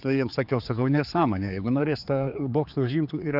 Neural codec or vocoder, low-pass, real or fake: codec, 16 kHz, 4 kbps, X-Codec, HuBERT features, trained on general audio; 5.4 kHz; fake